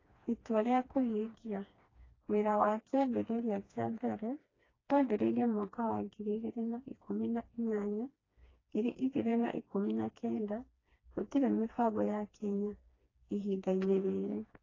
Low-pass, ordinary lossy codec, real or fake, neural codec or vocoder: 7.2 kHz; AAC, 32 kbps; fake; codec, 16 kHz, 2 kbps, FreqCodec, smaller model